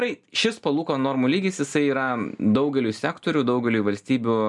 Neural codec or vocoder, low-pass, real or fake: none; 10.8 kHz; real